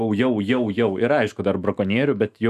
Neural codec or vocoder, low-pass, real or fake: autoencoder, 48 kHz, 128 numbers a frame, DAC-VAE, trained on Japanese speech; 14.4 kHz; fake